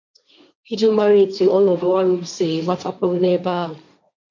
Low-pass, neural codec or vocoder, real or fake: 7.2 kHz; codec, 16 kHz, 1.1 kbps, Voila-Tokenizer; fake